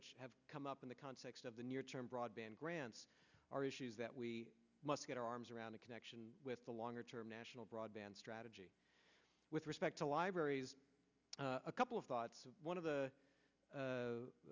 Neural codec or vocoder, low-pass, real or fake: none; 7.2 kHz; real